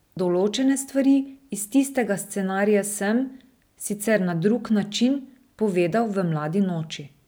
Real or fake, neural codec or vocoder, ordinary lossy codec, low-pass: real; none; none; none